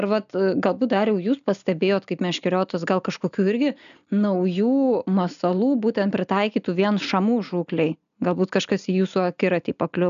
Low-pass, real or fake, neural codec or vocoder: 7.2 kHz; real; none